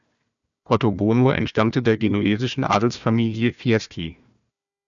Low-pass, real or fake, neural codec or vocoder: 7.2 kHz; fake; codec, 16 kHz, 1 kbps, FunCodec, trained on Chinese and English, 50 frames a second